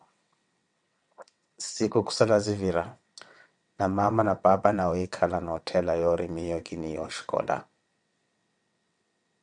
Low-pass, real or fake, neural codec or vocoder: 9.9 kHz; fake; vocoder, 22.05 kHz, 80 mel bands, WaveNeXt